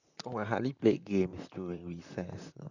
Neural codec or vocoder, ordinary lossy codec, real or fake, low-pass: vocoder, 44.1 kHz, 128 mel bands, Pupu-Vocoder; none; fake; 7.2 kHz